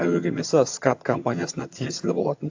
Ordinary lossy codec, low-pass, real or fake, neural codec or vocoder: AAC, 48 kbps; 7.2 kHz; fake; vocoder, 22.05 kHz, 80 mel bands, HiFi-GAN